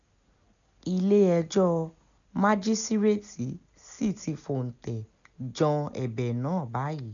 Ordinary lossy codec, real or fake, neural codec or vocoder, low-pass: none; real; none; 7.2 kHz